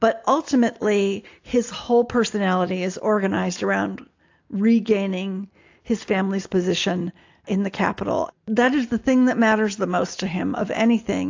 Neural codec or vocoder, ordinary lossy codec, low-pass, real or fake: none; AAC, 48 kbps; 7.2 kHz; real